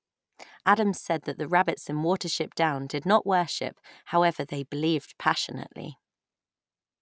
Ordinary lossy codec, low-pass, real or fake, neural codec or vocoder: none; none; real; none